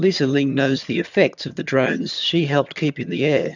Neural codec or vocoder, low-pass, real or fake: vocoder, 22.05 kHz, 80 mel bands, HiFi-GAN; 7.2 kHz; fake